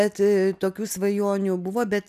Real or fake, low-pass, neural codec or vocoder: real; 14.4 kHz; none